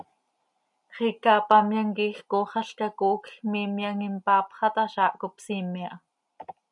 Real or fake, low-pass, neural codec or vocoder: real; 10.8 kHz; none